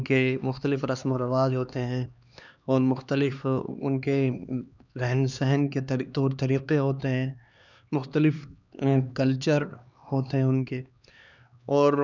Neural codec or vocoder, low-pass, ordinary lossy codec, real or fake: codec, 16 kHz, 2 kbps, X-Codec, HuBERT features, trained on LibriSpeech; 7.2 kHz; none; fake